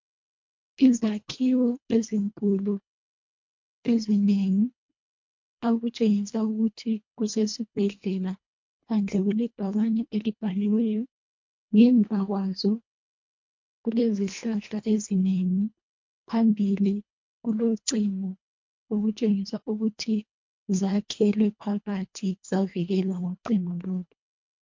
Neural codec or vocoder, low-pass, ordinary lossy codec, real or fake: codec, 24 kHz, 1.5 kbps, HILCodec; 7.2 kHz; MP3, 48 kbps; fake